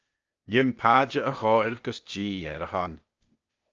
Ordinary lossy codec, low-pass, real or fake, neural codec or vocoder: Opus, 24 kbps; 7.2 kHz; fake; codec, 16 kHz, 0.8 kbps, ZipCodec